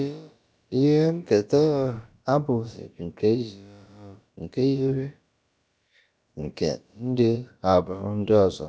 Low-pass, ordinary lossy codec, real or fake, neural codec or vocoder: none; none; fake; codec, 16 kHz, about 1 kbps, DyCAST, with the encoder's durations